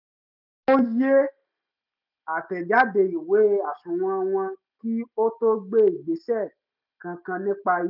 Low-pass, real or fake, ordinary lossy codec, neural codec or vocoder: 5.4 kHz; real; none; none